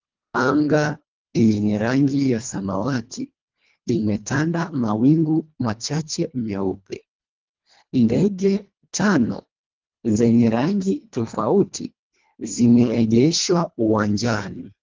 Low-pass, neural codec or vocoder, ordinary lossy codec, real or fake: 7.2 kHz; codec, 24 kHz, 1.5 kbps, HILCodec; Opus, 24 kbps; fake